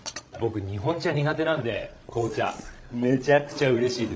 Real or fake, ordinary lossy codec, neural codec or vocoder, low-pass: fake; none; codec, 16 kHz, 16 kbps, FreqCodec, larger model; none